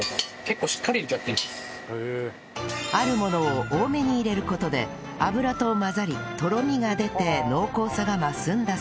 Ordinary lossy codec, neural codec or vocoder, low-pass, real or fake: none; none; none; real